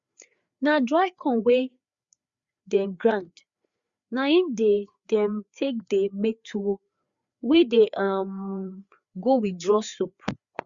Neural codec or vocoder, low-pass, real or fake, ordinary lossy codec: codec, 16 kHz, 4 kbps, FreqCodec, larger model; 7.2 kHz; fake; Opus, 64 kbps